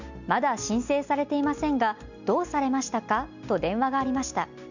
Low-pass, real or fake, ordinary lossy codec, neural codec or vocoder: 7.2 kHz; real; none; none